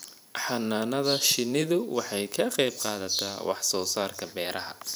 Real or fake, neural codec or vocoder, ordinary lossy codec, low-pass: fake; vocoder, 44.1 kHz, 128 mel bands every 512 samples, BigVGAN v2; none; none